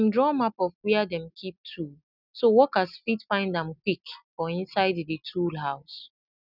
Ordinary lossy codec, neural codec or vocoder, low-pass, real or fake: none; none; 5.4 kHz; real